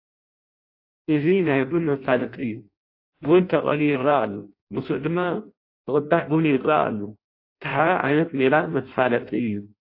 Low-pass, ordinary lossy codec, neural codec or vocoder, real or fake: 5.4 kHz; AAC, 32 kbps; codec, 16 kHz in and 24 kHz out, 0.6 kbps, FireRedTTS-2 codec; fake